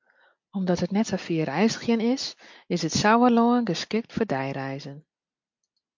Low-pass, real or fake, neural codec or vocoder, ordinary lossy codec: 7.2 kHz; real; none; MP3, 48 kbps